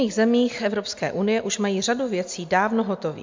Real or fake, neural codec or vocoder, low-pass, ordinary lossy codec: real; none; 7.2 kHz; MP3, 64 kbps